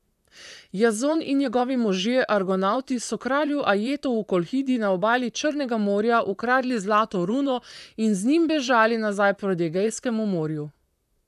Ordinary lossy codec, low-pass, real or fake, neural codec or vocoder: none; 14.4 kHz; fake; vocoder, 44.1 kHz, 128 mel bands, Pupu-Vocoder